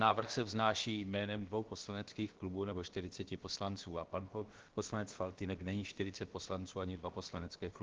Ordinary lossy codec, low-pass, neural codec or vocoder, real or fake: Opus, 16 kbps; 7.2 kHz; codec, 16 kHz, about 1 kbps, DyCAST, with the encoder's durations; fake